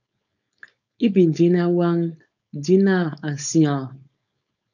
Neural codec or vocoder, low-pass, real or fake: codec, 16 kHz, 4.8 kbps, FACodec; 7.2 kHz; fake